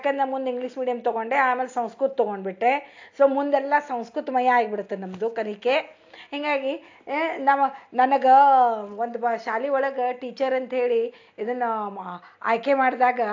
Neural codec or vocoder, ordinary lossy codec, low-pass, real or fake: none; AAC, 48 kbps; 7.2 kHz; real